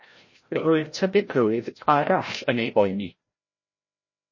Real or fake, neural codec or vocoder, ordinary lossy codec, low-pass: fake; codec, 16 kHz, 0.5 kbps, FreqCodec, larger model; MP3, 32 kbps; 7.2 kHz